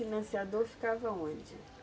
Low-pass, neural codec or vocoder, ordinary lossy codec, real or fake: none; none; none; real